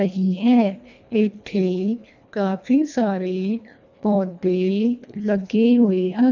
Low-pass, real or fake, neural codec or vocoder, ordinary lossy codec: 7.2 kHz; fake; codec, 24 kHz, 1.5 kbps, HILCodec; none